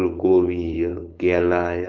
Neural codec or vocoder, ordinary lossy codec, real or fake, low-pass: codec, 16 kHz, 4.8 kbps, FACodec; Opus, 32 kbps; fake; 7.2 kHz